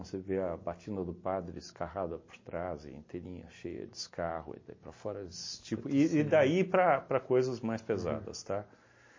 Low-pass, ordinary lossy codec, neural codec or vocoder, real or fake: 7.2 kHz; MP3, 32 kbps; none; real